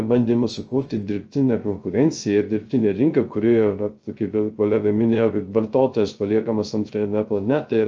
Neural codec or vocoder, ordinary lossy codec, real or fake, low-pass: codec, 16 kHz, 0.3 kbps, FocalCodec; Opus, 24 kbps; fake; 7.2 kHz